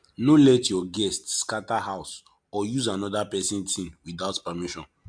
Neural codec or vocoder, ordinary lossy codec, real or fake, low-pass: none; MP3, 64 kbps; real; 9.9 kHz